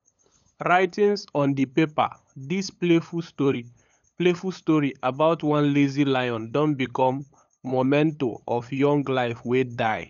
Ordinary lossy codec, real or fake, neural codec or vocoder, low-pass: none; fake; codec, 16 kHz, 8 kbps, FunCodec, trained on LibriTTS, 25 frames a second; 7.2 kHz